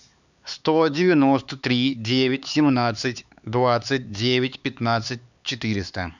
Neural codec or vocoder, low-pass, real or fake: codec, 16 kHz, 4 kbps, X-Codec, HuBERT features, trained on LibriSpeech; 7.2 kHz; fake